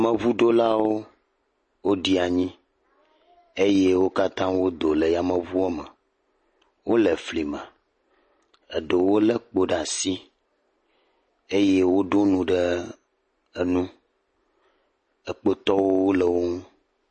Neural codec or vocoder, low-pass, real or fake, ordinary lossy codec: none; 10.8 kHz; real; MP3, 32 kbps